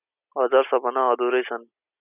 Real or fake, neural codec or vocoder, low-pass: real; none; 3.6 kHz